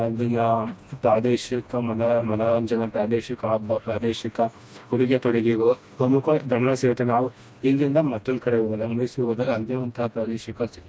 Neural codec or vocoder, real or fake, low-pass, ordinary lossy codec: codec, 16 kHz, 1 kbps, FreqCodec, smaller model; fake; none; none